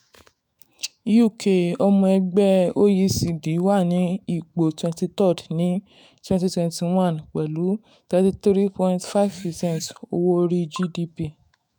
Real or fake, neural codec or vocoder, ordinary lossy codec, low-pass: fake; autoencoder, 48 kHz, 128 numbers a frame, DAC-VAE, trained on Japanese speech; none; none